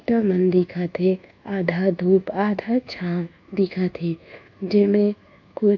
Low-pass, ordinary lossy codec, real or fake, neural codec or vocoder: 7.2 kHz; AAC, 48 kbps; fake; codec, 24 kHz, 1.2 kbps, DualCodec